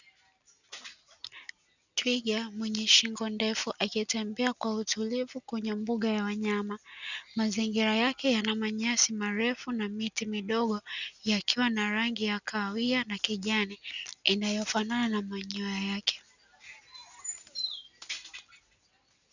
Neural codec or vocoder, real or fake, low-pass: none; real; 7.2 kHz